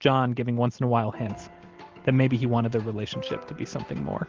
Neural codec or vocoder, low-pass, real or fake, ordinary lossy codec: none; 7.2 kHz; real; Opus, 16 kbps